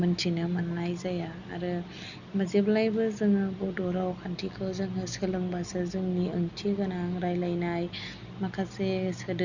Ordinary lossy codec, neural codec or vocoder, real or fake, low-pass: none; none; real; 7.2 kHz